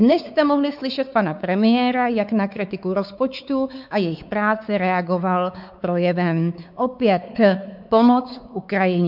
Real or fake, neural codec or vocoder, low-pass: fake; codec, 16 kHz, 4 kbps, X-Codec, WavLM features, trained on Multilingual LibriSpeech; 5.4 kHz